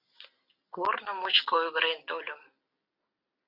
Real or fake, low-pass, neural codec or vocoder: real; 5.4 kHz; none